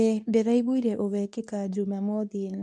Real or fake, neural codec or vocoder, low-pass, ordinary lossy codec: fake; codec, 24 kHz, 0.9 kbps, WavTokenizer, medium speech release version 2; none; none